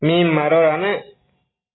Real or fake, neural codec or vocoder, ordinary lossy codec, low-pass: real; none; AAC, 16 kbps; 7.2 kHz